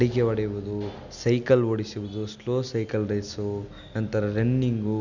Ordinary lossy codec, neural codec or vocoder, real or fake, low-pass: none; none; real; 7.2 kHz